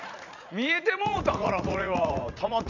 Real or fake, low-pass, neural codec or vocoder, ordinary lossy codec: fake; 7.2 kHz; vocoder, 44.1 kHz, 80 mel bands, Vocos; none